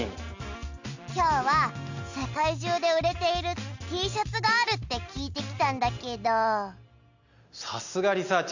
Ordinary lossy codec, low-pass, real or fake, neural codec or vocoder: Opus, 64 kbps; 7.2 kHz; real; none